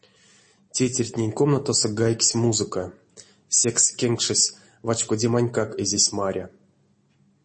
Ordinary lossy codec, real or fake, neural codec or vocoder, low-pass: MP3, 32 kbps; real; none; 10.8 kHz